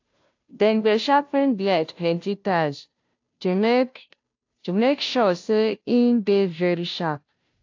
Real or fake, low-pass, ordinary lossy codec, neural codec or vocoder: fake; 7.2 kHz; AAC, 48 kbps; codec, 16 kHz, 0.5 kbps, FunCodec, trained on Chinese and English, 25 frames a second